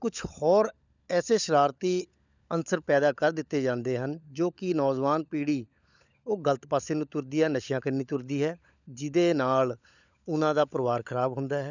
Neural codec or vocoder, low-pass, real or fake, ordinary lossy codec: codec, 16 kHz, 16 kbps, FunCodec, trained on LibriTTS, 50 frames a second; 7.2 kHz; fake; none